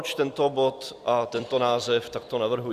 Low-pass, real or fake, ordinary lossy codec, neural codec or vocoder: 14.4 kHz; fake; Opus, 64 kbps; vocoder, 44.1 kHz, 128 mel bands every 256 samples, BigVGAN v2